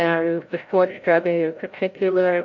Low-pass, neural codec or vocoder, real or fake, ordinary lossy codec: 7.2 kHz; codec, 16 kHz, 0.5 kbps, FreqCodec, larger model; fake; AAC, 48 kbps